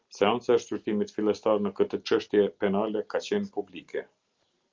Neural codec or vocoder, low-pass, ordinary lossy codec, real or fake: none; 7.2 kHz; Opus, 32 kbps; real